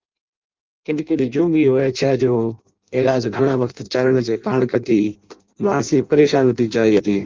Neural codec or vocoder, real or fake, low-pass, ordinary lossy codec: codec, 16 kHz in and 24 kHz out, 0.6 kbps, FireRedTTS-2 codec; fake; 7.2 kHz; Opus, 24 kbps